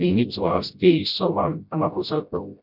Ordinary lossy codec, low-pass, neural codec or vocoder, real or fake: none; 5.4 kHz; codec, 16 kHz, 0.5 kbps, FreqCodec, smaller model; fake